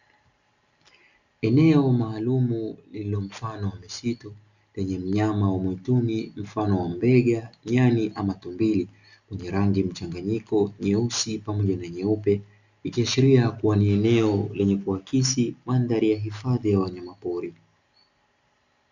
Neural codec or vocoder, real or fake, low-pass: none; real; 7.2 kHz